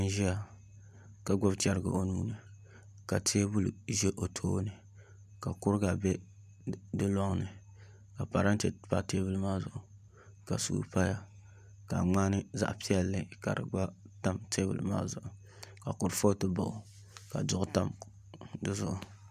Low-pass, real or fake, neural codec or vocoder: 14.4 kHz; real; none